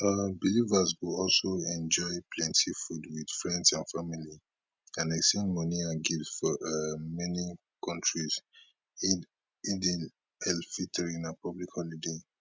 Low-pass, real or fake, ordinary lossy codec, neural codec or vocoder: none; real; none; none